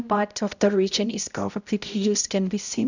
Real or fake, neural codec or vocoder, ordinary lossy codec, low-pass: fake; codec, 16 kHz, 0.5 kbps, X-Codec, HuBERT features, trained on balanced general audio; none; 7.2 kHz